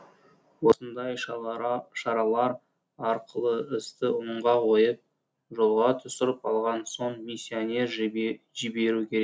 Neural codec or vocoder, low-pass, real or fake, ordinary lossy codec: none; none; real; none